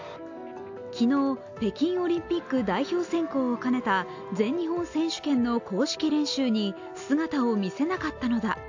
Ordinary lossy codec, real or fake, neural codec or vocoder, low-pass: AAC, 48 kbps; real; none; 7.2 kHz